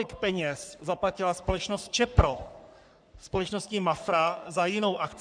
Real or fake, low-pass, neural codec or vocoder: fake; 9.9 kHz; codec, 44.1 kHz, 3.4 kbps, Pupu-Codec